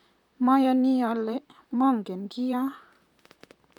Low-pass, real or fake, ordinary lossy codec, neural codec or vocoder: 19.8 kHz; fake; none; vocoder, 44.1 kHz, 128 mel bands, Pupu-Vocoder